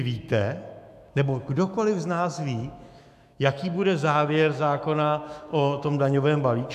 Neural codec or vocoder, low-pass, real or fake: autoencoder, 48 kHz, 128 numbers a frame, DAC-VAE, trained on Japanese speech; 14.4 kHz; fake